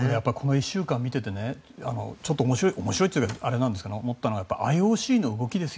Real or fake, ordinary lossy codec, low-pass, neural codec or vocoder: real; none; none; none